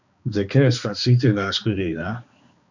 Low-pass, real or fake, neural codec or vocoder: 7.2 kHz; fake; codec, 16 kHz, 2 kbps, X-Codec, HuBERT features, trained on balanced general audio